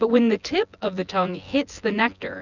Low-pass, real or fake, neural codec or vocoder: 7.2 kHz; fake; vocoder, 24 kHz, 100 mel bands, Vocos